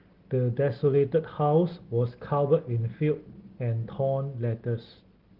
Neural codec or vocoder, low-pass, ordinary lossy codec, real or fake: none; 5.4 kHz; Opus, 16 kbps; real